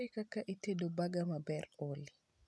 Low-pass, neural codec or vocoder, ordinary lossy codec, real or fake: none; none; none; real